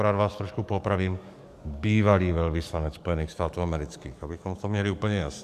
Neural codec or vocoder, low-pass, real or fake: codec, 44.1 kHz, 7.8 kbps, DAC; 14.4 kHz; fake